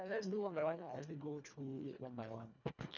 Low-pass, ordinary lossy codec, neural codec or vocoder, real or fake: 7.2 kHz; none; codec, 24 kHz, 1.5 kbps, HILCodec; fake